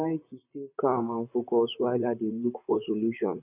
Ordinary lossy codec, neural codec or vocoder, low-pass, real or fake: none; vocoder, 44.1 kHz, 128 mel bands, Pupu-Vocoder; 3.6 kHz; fake